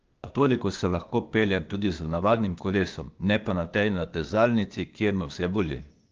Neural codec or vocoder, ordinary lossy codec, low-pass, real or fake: codec, 16 kHz, 0.8 kbps, ZipCodec; Opus, 24 kbps; 7.2 kHz; fake